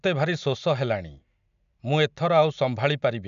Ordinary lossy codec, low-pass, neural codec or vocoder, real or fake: MP3, 96 kbps; 7.2 kHz; none; real